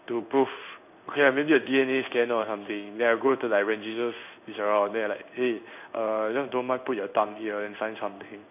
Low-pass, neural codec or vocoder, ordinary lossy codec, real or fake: 3.6 kHz; codec, 16 kHz in and 24 kHz out, 1 kbps, XY-Tokenizer; none; fake